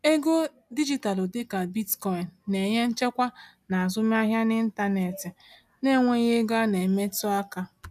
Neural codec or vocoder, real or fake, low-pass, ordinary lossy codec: none; real; 14.4 kHz; none